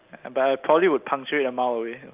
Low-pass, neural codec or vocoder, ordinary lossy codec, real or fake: 3.6 kHz; none; Opus, 32 kbps; real